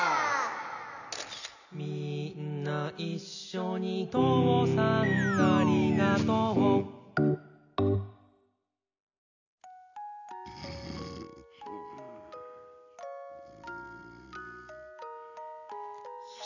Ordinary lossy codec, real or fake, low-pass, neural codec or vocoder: none; real; 7.2 kHz; none